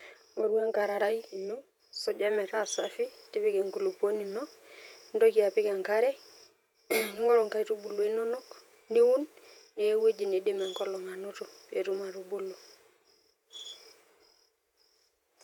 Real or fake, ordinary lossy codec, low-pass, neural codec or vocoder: fake; none; 19.8 kHz; vocoder, 48 kHz, 128 mel bands, Vocos